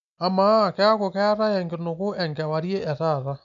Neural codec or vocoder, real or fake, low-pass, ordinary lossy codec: none; real; 7.2 kHz; none